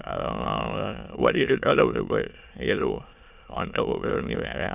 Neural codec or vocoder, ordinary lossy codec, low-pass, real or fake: autoencoder, 22.05 kHz, a latent of 192 numbers a frame, VITS, trained on many speakers; none; 3.6 kHz; fake